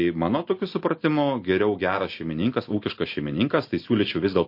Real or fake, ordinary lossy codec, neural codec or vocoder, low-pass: real; MP3, 32 kbps; none; 5.4 kHz